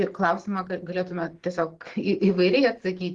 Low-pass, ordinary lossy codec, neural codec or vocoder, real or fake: 10.8 kHz; Opus, 16 kbps; none; real